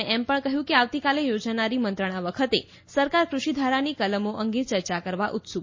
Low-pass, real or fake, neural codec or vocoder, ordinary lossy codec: 7.2 kHz; real; none; MP3, 32 kbps